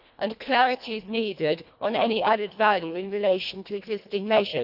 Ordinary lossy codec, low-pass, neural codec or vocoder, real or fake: none; 5.4 kHz; codec, 24 kHz, 1.5 kbps, HILCodec; fake